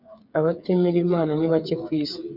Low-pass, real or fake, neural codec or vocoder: 5.4 kHz; fake; codec, 16 kHz, 8 kbps, FreqCodec, smaller model